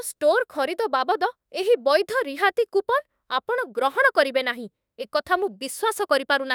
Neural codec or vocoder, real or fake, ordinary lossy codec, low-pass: autoencoder, 48 kHz, 32 numbers a frame, DAC-VAE, trained on Japanese speech; fake; none; none